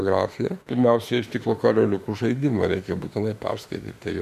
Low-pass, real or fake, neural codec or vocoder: 14.4 kHz; fake; autoencoder, 48 kHz, 32 numbers a frame, DAC-VAE, trained on Japanese speech